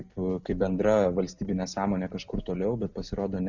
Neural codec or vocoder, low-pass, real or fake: none; 7.2 kHz; real